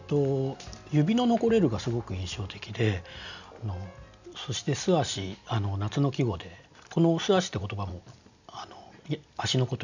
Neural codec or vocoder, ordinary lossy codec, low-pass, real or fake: none; none; 7.2 kHz; real